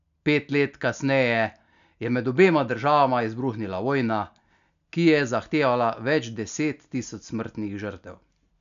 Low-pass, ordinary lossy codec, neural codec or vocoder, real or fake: 7.2 kHz; none; none; real